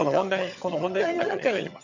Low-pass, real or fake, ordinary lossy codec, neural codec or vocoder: 7.2 kHz; fake; none; vocoder, 22.05 kHz, 80 mel bands, HiFi-GAN